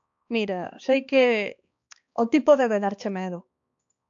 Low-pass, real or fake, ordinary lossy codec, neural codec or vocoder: 7.2 kHz; fake; AAC, 64 kbps; codec, 16 kHz, 2 kbps, X-Codec, HuBERT features, trained on balanced general audio